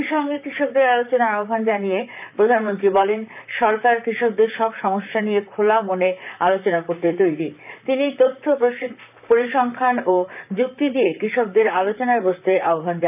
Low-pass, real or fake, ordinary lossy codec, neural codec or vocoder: 3.6 kHz; fake; none; vocoder, 44.1 kHz, 128 mel bands, Pupu-Vocoder